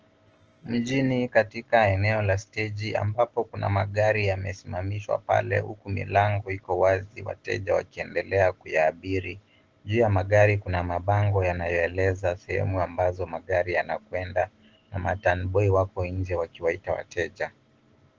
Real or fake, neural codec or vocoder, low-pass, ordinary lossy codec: real; none; 7.2 kHz; Opus, 16 kbps